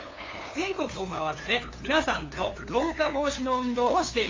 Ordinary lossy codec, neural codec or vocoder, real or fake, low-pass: AAC, 32 kbps; codec, 16 kHz, 2 kbps, FunCodec, trained on LibriTTS, 25 frames a second; fake; 7.2 kHz